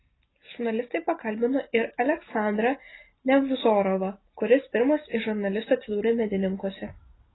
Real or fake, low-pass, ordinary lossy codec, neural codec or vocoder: real; 7.2 kHz; AAC, 16 kbps; none